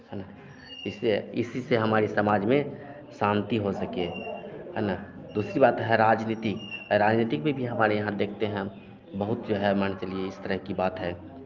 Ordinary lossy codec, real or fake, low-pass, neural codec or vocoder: Opus, 24 kbps; real; 7.2 kHz; none